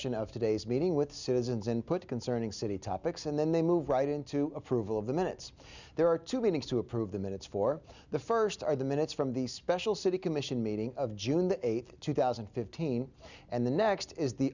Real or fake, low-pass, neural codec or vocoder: real; 7.2 kHz; none